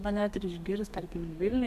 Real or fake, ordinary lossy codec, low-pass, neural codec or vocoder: fake; MP3, 96 kbps; 14.4 kHz; codec, 32 kHz, 1.9 kbps, SNAC